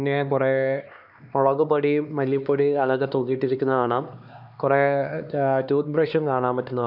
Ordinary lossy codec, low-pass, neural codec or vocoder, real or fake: none; 5.4 kHz; codec, 16 kHz, 2 kbps, X-Codec, HuBERT features, trained on LibriSpeech; fake